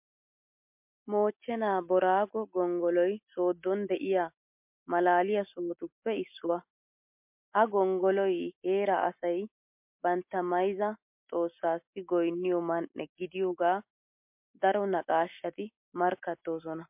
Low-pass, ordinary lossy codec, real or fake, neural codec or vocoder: 3.6 kHz; MP3, 32 kbps; real; none